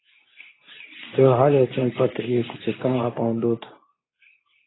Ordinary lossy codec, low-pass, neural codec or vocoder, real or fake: AAC, 16 kbps; 7.2 kHz; codec, 24 kHz, 0.9 kbps, WavTokenizer, medium speech release version 2; fake